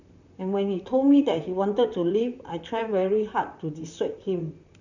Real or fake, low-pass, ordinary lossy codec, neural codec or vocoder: fake; 7.2 kHz; none; vocoder, 44.1 kHz, 128 mel bands, Pupu-Vocoder